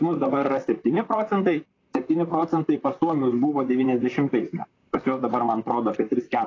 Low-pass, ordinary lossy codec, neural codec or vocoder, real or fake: 7.2 kHz; AAC, 32 kbps; vocoder, 44.1 kHz, 128 mel bands, Pupu-Vocoder; fake